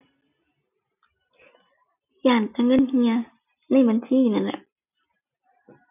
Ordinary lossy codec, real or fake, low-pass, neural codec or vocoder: none; real; 3.6 kHz; none